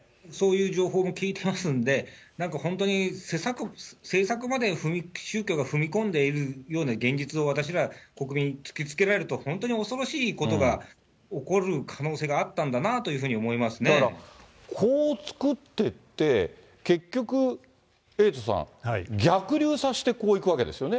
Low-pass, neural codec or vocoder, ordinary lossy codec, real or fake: none; none; none; real